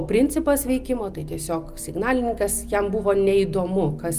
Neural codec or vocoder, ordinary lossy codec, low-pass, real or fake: none; Opus, 32 kbps; 14.4 kHz; real